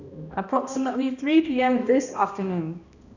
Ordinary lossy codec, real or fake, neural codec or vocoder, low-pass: none; fake; codec, 16 kHz, 1 kbps, X-Codec, HuBERT features, trained on balanced general audio; 7.2 kHz